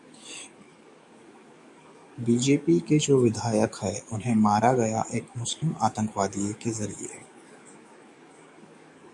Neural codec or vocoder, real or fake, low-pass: codec, 44.1 kHz, 7.8 kbps, DAC; fake; 10.8 kHz